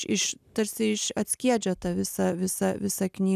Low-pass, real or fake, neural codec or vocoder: 14.4 kHz; real; none